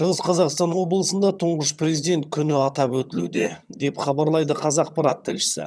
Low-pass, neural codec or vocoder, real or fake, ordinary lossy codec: none; vocoder, 22.05 kHz, 80 mel bands, HiFi-GAN; fake; none